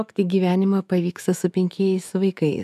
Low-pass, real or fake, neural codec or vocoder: 14.4 kHz; fake; autoencoder, 48 kHz, 128 numbers a frame, DAC-VAE, trained on Japanese speech